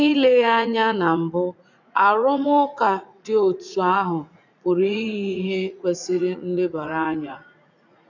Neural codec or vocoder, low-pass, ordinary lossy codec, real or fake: vocoder, 44.1 kHz, 80 mel bands, Vocos; 7.2 kHz; none; fake